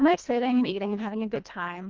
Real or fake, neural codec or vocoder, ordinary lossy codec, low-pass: fake; codec, 24 kHz, 1.5 kbps, HILCodec; Opus, 32 kbps; 7.2 kHz